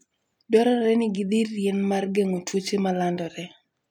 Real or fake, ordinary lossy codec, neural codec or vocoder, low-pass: real; none; none; 19.8 kHz